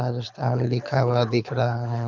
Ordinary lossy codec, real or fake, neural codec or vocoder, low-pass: none; fake; codec, 24 kHz, 6 kbps, HILCodec; 7.2 kHz